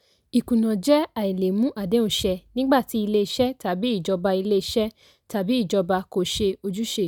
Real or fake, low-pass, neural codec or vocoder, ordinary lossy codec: real; none; none; none